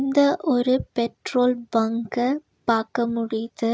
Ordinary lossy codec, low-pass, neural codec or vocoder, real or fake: none; none; none; real